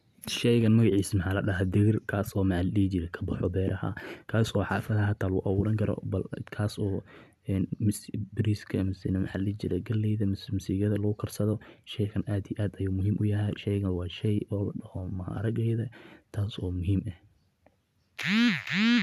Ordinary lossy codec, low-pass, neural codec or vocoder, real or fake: none; 14.4 kHz; none; real